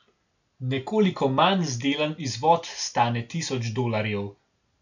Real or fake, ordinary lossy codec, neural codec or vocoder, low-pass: real; none; none; 7.2 kHz